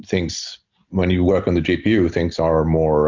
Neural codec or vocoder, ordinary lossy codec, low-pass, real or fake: none; MP3, 64 kbps; 7.2 kHz; real